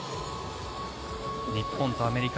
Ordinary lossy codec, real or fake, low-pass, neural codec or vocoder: none; real; none; none